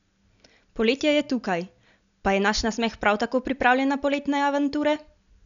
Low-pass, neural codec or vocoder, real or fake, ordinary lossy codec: 7.2 kHz; none; real; none